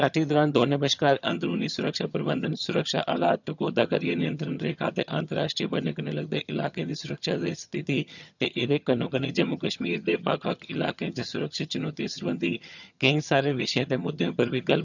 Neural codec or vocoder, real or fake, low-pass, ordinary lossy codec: vocoder, 22.05 kHz, 80 mel bands, HiFi-GAN; fake; 7.2 kHz; none